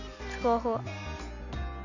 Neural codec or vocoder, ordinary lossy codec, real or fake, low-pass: none; none; real; 7.2 kHz